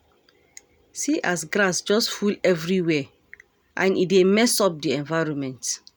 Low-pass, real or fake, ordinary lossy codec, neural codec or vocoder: none; real; none; none